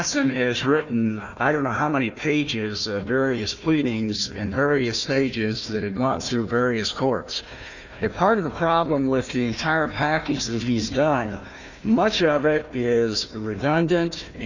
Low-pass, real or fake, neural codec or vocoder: 7.2 kHz; fake; codec, 16 kHz, 1 kbps, FunCodec, trained on Chinese and English, 50 frames a second